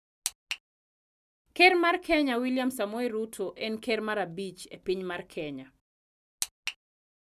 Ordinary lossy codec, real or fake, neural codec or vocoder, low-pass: none; real; none; 14.4 kHz